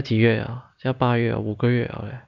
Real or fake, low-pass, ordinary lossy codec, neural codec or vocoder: real; 7.2 kHz; none; none